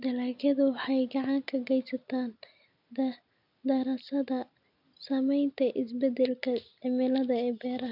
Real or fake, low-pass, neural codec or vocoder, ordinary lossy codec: real; 5.4 kHz; none; none